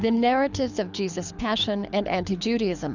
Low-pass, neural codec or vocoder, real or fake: 7.2 kHz; codec, 24 kHz, 6 kbps, HILCodec; fake